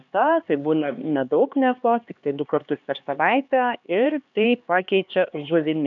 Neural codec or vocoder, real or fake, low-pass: codec, 16 kHz, 2 kbps, X-Codec, HuBERT features, trained on LibriSpeech; fake; 7.2 kHz